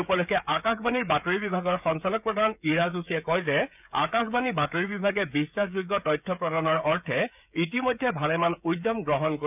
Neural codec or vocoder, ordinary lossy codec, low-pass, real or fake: codec, 16 kHz, 16 kbps, FreqCodec, smaller model; none; 3.6 kHz; fake